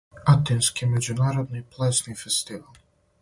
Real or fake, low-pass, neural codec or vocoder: real; 10.8 kHz; none